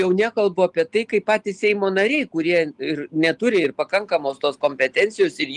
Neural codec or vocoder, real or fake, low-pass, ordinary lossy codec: none; real; 10.8 kHz; Opus, 24 kbps